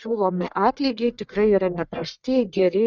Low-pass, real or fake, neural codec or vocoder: 7.2 kHz; fake; codec, 44.1 kHz, 1.7 kbps, Pupu-Codec